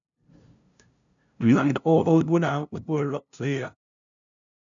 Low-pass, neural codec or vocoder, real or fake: 7.2 kHz; codec, 16 kHz, 0.5 kbps, FunCodec, trained on LibriTTS, 25 frames a second; fake